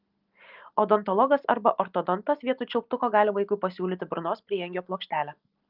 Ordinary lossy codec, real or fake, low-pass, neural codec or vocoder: Opus, 24 kbps; real; 5.4 kHz; none